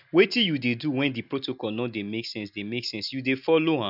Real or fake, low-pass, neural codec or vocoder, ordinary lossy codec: real; 5.4 kHz; none; none